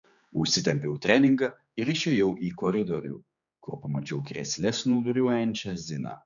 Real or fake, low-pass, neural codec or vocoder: fake; 7.2 kHz; codec, 16 kHz, 4 kbps, X-Codec, HuBERT features, trained on general audio